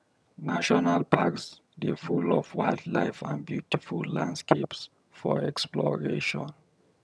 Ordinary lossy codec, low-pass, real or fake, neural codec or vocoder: none; none; fake; vocoder, 22.05 kHz, 80 mel bands, HiFi-GAN